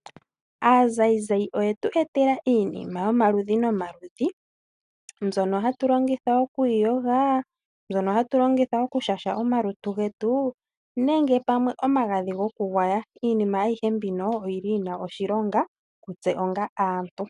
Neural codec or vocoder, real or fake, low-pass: none; real; 10.8 kHz